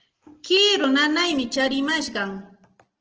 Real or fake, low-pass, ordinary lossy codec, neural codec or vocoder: real; 7.2 kHz; Opus, 16 kbps; none